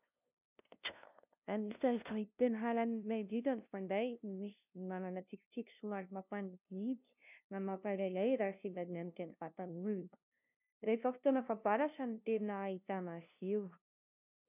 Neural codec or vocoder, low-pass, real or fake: codec, 16 kHz, 0.5 kbps, FunCodec, trained on LibriTTS, 25 frames a second; 3.6 kHz; fake